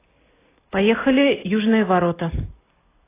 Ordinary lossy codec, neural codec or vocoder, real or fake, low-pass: AAC, 16 kbps; none; real; 3.6 kHz